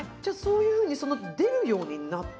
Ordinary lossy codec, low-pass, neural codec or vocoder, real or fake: none; none; none; real